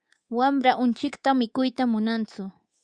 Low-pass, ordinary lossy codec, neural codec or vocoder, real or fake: 9.9 kHz; Opus, 64 kbps; codec, 24 kHz, 3.1 kbps, DualCodec; fake